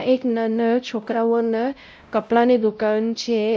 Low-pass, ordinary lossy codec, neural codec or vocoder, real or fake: none; none; codec, 16 kHz, 0.5 kbps, X-Codec, WavLM features, trained on Multilingual LibriSpeech; fake